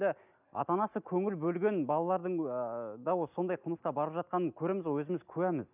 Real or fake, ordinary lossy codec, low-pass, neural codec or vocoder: real; none; 3.6 kHz; none